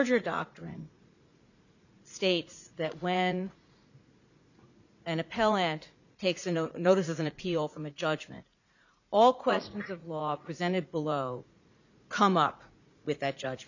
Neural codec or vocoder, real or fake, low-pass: vocoder, 44.1 kHz, 80 mel bands, Vocos; fake; 7.2 kHz